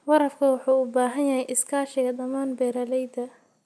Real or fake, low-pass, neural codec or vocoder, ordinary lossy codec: real; none; none; none